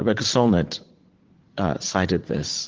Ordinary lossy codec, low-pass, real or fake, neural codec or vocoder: Opus, 16 kbps; 7.2 kHz; fake; vocoder, 44.1 kHz, 80 mel bands, Vocos